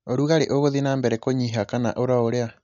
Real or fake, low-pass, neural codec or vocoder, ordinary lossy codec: real; 7.2 kHz; none; MP3, 96 kbps